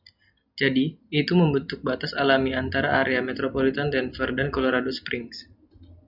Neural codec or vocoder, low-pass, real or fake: none; 5.4 kHz; real